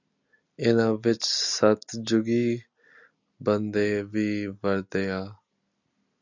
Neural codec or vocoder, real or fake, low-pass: none; real; 7.2 kHz